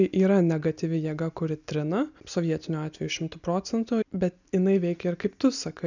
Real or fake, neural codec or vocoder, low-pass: real; none; 7.2 kHz